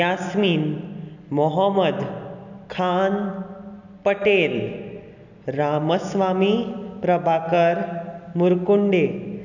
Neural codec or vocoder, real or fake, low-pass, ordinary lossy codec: none; real; 7.2 kHz; none